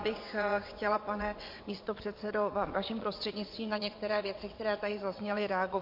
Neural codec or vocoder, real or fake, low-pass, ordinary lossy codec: vocoder, 22.05 kHz, 80 mel bands, WaveNeXt; fake; 5.4 kHz; MP3, 32 kbps